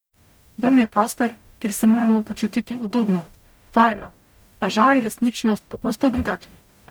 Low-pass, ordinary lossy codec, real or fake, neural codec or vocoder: none; none; fake; codec, 44.1 kHz, 0.9 kbps, DAC